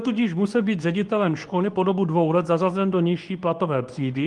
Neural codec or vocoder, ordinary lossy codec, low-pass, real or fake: codec, 24 kHz, 0.9 kbps, WavTokenizer, medium speech release version 2; Opus, 24 kbps; 10.8 kHz; fake